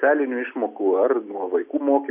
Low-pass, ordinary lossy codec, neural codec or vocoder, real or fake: 3.6 kHz; MP3, 32 kbps; none; real